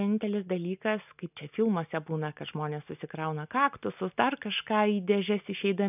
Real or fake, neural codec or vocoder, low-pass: real; none; 3.6 kHz